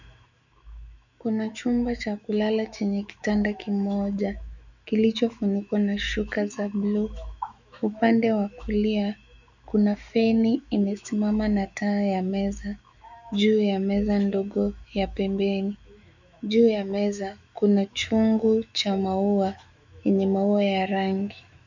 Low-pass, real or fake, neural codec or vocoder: 7.2 kHz; fake; autoencoder, 48 kHz, 128 numbers a frame, DAC-VAE, trained on Japanese speech